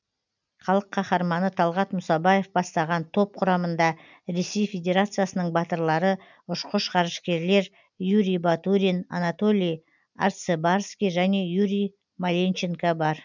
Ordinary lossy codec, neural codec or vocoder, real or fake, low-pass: none; none; real; 7.2 kHz